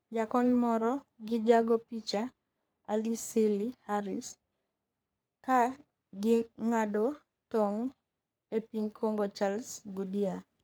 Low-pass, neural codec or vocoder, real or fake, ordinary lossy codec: none; codec, 44.1 kHz, 3.4 kbps, Pupu-Codec; fake; none